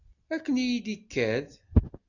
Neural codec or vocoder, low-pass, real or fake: none; 7.2 kHz; real